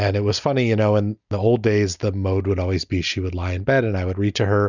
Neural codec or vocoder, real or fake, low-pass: none; real; 7.2 kHz